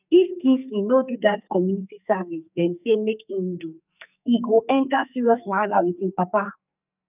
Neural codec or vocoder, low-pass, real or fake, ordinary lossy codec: codec, 44.1 kHz, 2.6 kbps, SNAC; 3.6 kHz; fake; none